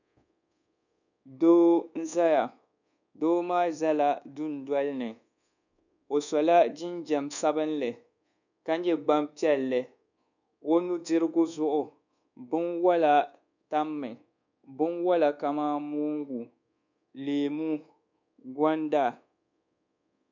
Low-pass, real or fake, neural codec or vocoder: 7.2 kHz; fake; codec, 24 kHz, 1.2 kbps, DualCodec